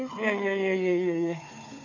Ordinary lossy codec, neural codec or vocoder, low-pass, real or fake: AAC, 48 kbps; codec, 16 kHz, 4 kbps, FunCodec, trained on Chinese and English, 50 frames a second; 7.2 kHz; fake